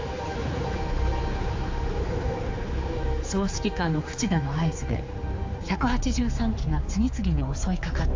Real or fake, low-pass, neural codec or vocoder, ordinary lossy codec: fake; 7.2 kHz; codec, 16 kHz, 4 kbps, X-Codec, HuBERT features, trained on general audio; AAC, 48 kbps